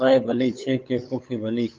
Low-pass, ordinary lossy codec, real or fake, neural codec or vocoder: 7.2 kHz; Opus, 24 kbps; fake; codec, 16 kHz, 8 kbps, FunCodec, trained on LibriTTS, 25 frames a second